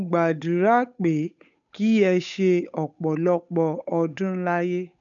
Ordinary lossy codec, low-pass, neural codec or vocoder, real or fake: none; 7.2 kHz; codec, 16 kHz, 8 kbps, FunCodec, trained on Chinese and English, 25 frames a second; fake